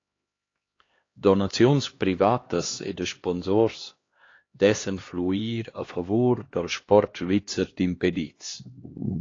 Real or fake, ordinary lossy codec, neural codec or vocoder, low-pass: fake; AAC, 32 kbps; codec, 16 kHz, 2 kbps, X-Codec, HuBERT features, trained on LibriSpeech; 7.2 kHz